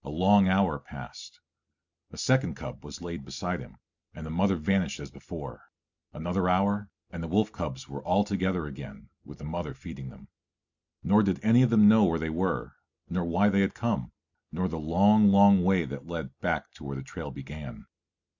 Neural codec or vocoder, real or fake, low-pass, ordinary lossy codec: none; real; 7.2 kHz; MP3, 64 kbps